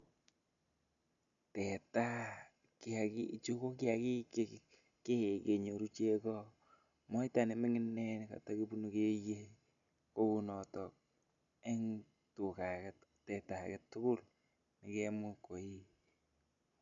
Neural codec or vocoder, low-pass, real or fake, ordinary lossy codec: none; 7.2 kHz; real; none